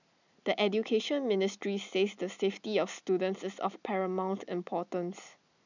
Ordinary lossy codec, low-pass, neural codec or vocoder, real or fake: none; 7.2 kHz; none; real